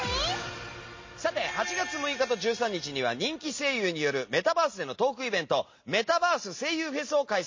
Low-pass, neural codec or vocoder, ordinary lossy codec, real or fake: 7.2 kHz; none; MP3, 32 kbps; real